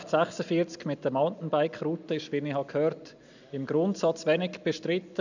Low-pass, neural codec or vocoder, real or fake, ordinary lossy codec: 7.2 kHz; none; real; none